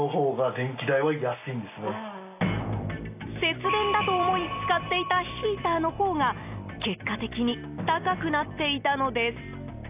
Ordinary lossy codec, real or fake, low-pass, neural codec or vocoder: AAC, 32 kbps; real; 3.6 kHz; none